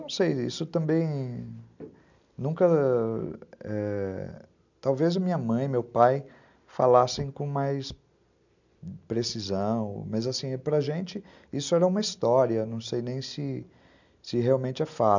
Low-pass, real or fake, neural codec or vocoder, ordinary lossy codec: 7.2 kHz; real; none; none